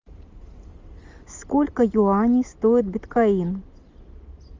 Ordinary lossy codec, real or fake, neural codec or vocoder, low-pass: Opus, 32 kbps; real; none; 7.2 kHz